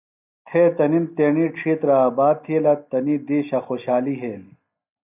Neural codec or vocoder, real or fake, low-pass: none; real; 3.6 kHz